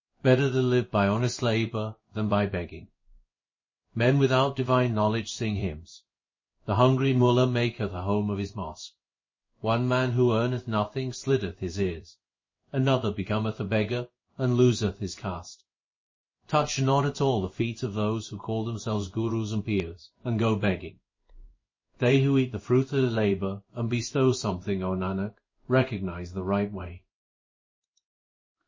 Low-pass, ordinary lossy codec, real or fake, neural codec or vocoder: 7.2 kHz; MP3, 32 kbps; fake; codec, 16 kHz in and 24 kHz out, 1 kbps, XY-Tokenizer